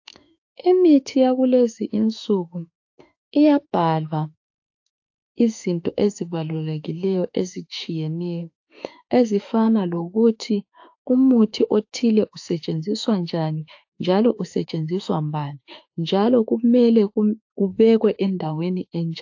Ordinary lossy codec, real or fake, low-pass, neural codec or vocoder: AAC, 48 kbps; fake; 7.2 kHz; autoencoder, 48 kHz, 32 numbers a frame, DAC-VAE, trained on Japanese speech